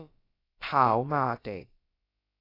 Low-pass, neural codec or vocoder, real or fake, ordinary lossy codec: 5.4 kHz; codec, 16 kHz, about 1 kbps, DyCAST, with the encoder's durations; fake; AAC, 32 kbps